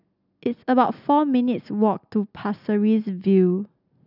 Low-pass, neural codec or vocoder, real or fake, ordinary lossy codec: 5.4 kHz; none; real; none